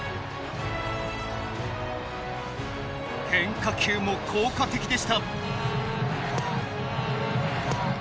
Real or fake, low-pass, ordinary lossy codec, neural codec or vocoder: real; none; none; none